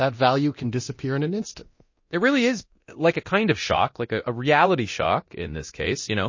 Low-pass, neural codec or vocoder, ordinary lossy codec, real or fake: 7.2 kHz; codec, 24 kHz, 0.9 kbps, DualCodec; MP3, 32 kbps; fake